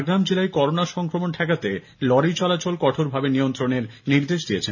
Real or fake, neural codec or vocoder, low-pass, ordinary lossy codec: real; none; 7.2 kHz; none